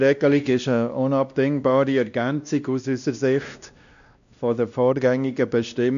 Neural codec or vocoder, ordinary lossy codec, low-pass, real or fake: codec, 16 kHz, 1 kbps, X-Codec, WavLM features, trained on Multilingual LibriSpeech; none; 7.2 kHz; fake